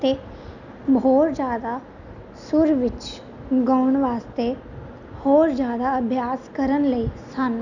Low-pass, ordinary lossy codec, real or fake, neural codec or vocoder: 7.2 kHz; none; real; none